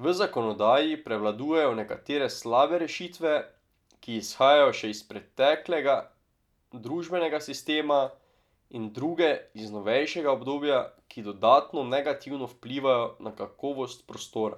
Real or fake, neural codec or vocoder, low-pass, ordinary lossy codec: real; none; 19.8 kHz; none